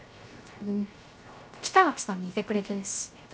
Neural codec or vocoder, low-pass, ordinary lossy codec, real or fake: codec, 16 kHz, 0.3 kbps, FocalCodec; none; none; fake